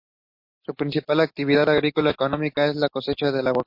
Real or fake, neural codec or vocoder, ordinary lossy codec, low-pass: real; none; MP3, 32 kbps; 5.4 kHz